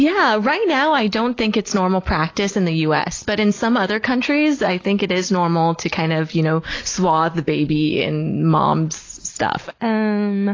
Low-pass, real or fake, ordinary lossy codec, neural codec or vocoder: 7.2 kHz; real; AAC, 32 kbps; none